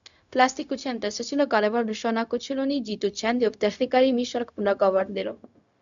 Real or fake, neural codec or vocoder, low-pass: fake; codec, 16 kHz, 0.4 kbps, LongCat-Audio-Codec; 7.2 kHz